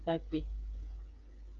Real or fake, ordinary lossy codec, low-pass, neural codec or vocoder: fake; Opus, 32 kbps; 7.2 kHz; codec, 16 kHz, 8 kbps, FreqCodec, smaller model